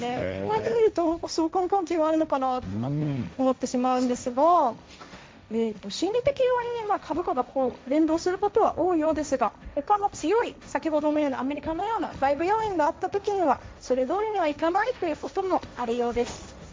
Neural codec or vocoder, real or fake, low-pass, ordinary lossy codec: codec, 16 kHz, 1.1 kbps, Voila-Tokenizer; fake; none; none